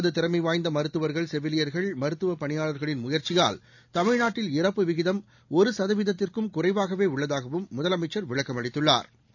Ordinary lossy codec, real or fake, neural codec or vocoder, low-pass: none; real; none; 7.2 kHz